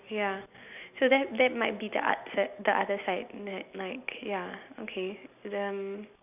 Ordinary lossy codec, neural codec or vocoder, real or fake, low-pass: none; none; real; 3.6 kHz